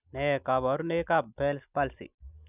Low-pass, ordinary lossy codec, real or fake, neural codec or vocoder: 3.6 kHz; none; real; none